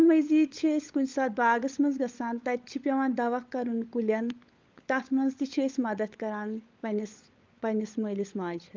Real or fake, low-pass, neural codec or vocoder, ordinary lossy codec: fake; 7.2 kHz; codec, 16 kHz, 16 kbps, FunCodec, trained on LibriTTS, 50 frames a second; Opus, 32 kbps